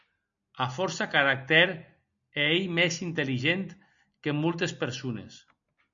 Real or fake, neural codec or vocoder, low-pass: real; none; 7.2 kHz